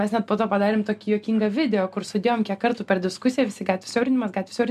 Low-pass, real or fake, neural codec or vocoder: 14.4 kHz; real; none